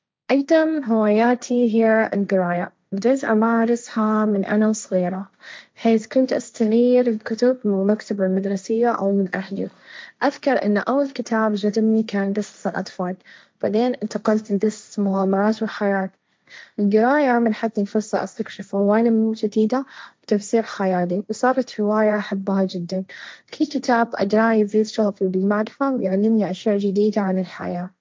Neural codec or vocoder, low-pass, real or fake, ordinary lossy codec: codec, 16 kHz, 1.1 kbps, Voila-Tokenizer; none; fake; none